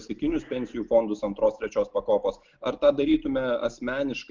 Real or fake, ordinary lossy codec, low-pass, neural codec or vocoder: real; Opus, 32 kbps; 7.2 kHz; none